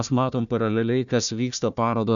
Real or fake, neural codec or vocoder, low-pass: fake; codec, 16 kHz, 1 kbps, FunCodec, trained on Chinese and English, 50 frames a second; 7.2 kHz